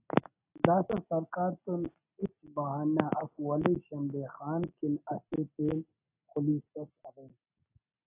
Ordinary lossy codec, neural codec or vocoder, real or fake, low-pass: AAC, 32 kbps; none; real; 3.6 kHz